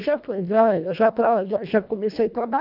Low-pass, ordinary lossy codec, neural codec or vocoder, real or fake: 5.4 kHz; none; codec, 24 kHz, 1.5 kbps, HILCodec; fake